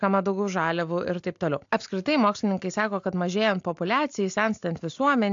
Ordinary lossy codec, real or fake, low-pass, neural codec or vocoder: AAC, 64 kbps; real; 7.2 kHz; none